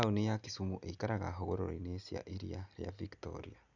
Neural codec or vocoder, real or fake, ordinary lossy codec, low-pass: none; real; none; 7.2 kHz